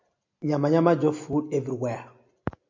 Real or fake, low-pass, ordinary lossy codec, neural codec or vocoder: real; 7.2 kHz; MP3, 48 kbps; none